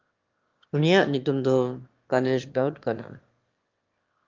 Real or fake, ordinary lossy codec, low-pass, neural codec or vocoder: fake; Opus, 32 kbps; 7.2 kHz; autoencoder, 22.05 kHz, a latent of 192 numbers a frame, VITS, trained on one speaker